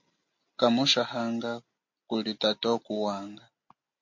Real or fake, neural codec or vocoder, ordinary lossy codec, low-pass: real; none; MP3, 48 kbps; 7.2 kHz